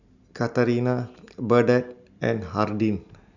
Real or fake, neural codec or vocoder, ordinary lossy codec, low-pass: real; none; none; 7.2 kHz